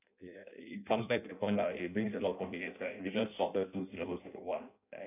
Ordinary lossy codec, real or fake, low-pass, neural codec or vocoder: none; fake; 3.6 kHz; codec, 16 kHz in and 24 kHz out, 0.6 kbps, FireRedTTS-2 codec